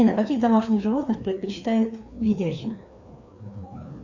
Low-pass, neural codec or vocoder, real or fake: 7.2 kHz; codec, 16 kHz, 2 kbps, FreqCodec, larger model; fake